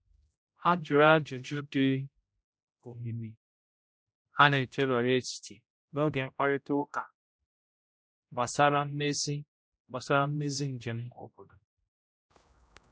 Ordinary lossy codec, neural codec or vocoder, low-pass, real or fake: none; codec, 16 kHz, 0.5 kbps, X-Codec, HuBERT features, trained on general audio; none; fake